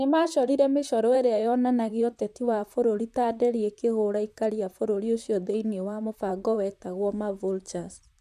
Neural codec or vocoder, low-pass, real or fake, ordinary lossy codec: vocoder, 44.1 kHz, 128 mel bands, Pupu-Vocoder; 14.4 kHz; fake; none